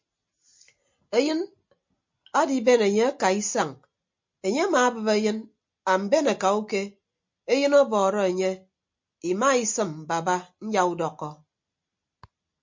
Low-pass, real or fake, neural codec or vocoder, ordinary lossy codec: 7.2 kHz; real; none; MP3, 48 kbps